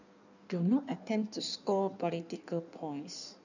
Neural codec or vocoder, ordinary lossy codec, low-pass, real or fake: codec, 16 kHz in and 24 kHz out, 1.1 kbps, FireRedTTS-2 codec; none; 7.2 kHz; fake